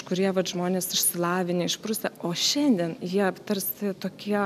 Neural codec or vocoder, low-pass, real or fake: none; 14.4 kHz; real